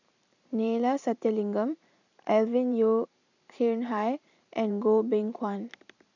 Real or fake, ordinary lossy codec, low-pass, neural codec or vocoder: fake; none; 7.2 kHz; vocoder, 44.1 kHz, 128 mel bands every 512 samples, BigVGAN v2